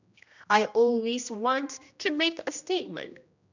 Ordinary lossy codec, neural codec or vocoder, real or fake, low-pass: none; codec, 16 kHz, 1 kbps, X-Codec, HuBERT features, trained on general audio; fake; 7.2 kHz